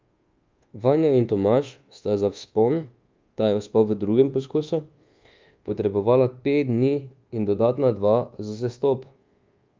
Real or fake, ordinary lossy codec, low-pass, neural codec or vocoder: fake; Opus, 32 kbps; 7.2 kHz; codec, 24 kHz, 1.2 kbps, DualCodec